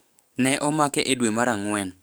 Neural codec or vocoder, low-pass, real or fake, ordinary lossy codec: codec, 44.1 kHz, 7.8 kbps, DAC; none; fake; none